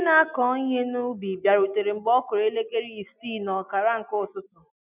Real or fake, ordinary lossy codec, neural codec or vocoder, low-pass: real; none; none; 3.6 kHz